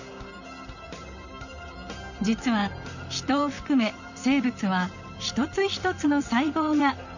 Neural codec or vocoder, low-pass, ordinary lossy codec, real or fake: vocoder, 44.1 kHz, 128 mel bands, Pupu-Vocoder; 7.2 kHz; none; fake